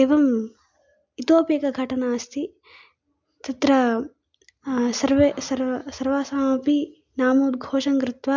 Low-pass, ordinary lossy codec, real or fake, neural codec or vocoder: 7.2 kHz; MP3, 64 kbps; real; none